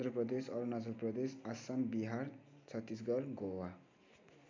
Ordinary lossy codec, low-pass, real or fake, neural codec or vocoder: MP3, 64 kbps; 7.2 kHz; real; none